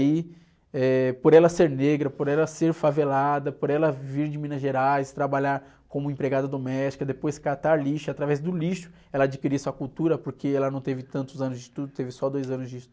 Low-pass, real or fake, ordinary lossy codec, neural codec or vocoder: none; real; none; none